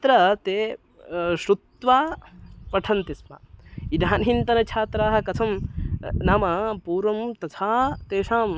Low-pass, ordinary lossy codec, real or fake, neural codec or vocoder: none; none; real; none